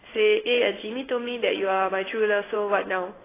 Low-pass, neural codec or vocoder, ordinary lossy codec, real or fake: 3.6 kHz; codec, 16 kHz in and 24 kHz out, 1 kbps, XY-Tokenizer; AAC, 16 kbps; fake